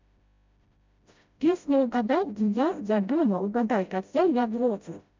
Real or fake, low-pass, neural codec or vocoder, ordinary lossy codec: fake; 7.2 kHz; codec, 16 kHz, 0.5 kbps, FreqCodec, smaller model; MP3, 64 kbps